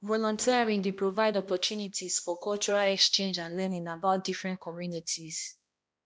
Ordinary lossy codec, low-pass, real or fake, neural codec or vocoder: none; none; fake; codec, 16 kHz, 1 kbps, X-Codec, HuBERT features, trained on balanced general audio